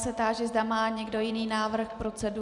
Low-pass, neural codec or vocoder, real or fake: 10.8 kHz; none; real